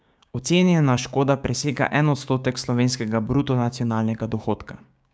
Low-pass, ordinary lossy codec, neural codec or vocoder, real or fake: none; none; codec, 16 kHz, 6 kbps, DAC; fake